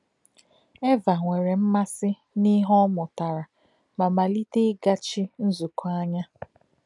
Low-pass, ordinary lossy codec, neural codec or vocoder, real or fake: 10.8 kHz; none; none; real